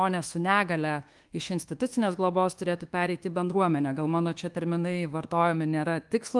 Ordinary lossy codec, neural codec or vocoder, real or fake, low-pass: Opus, 24 kbps; codec, 24 kHz, 1.2 kbps, DualCodec; fake; 10.8 kHz